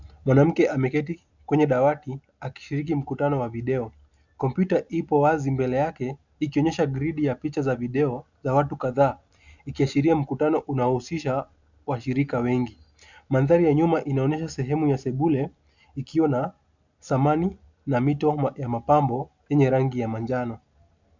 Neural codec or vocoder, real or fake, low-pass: none; real; 7.2 kHz